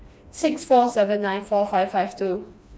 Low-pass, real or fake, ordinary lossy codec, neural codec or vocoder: none; fake; none; codec, 16 kHz, 2 kbps, FreqCodec, smaller model